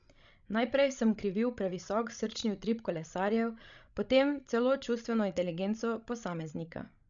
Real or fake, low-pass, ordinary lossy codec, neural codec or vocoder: fake; 7.2 kHz; none; codec, 16 kHz, 16 kbps, FreqCodec, larger model